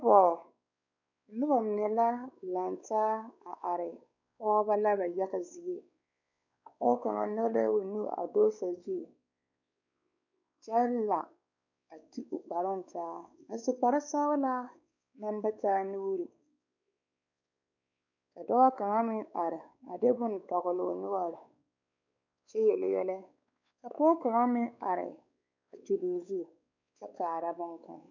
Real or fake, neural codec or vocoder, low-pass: fake; codec, 16 kHz, 4 kbps, X-Codec, WavLM features, trained on Multilingual LibriSpeech; 7.2 kHz